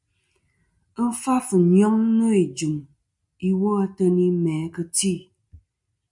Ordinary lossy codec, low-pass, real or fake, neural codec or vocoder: MP3, 96 kbps; 10.8 kHz; real; none